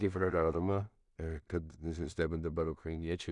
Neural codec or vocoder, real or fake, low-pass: codec, 16 kHz in and 24 kHz out, 0.4 kbps, LongCat-Audio-Codec, two codebook decoder; fake; 10.8 kHz